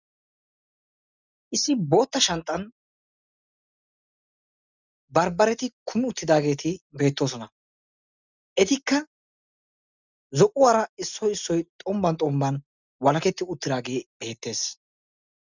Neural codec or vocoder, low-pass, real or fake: none; 7.2 kHz; real